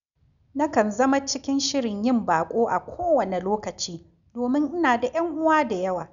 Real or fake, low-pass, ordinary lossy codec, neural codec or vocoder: real; 7.2 kHz; none; none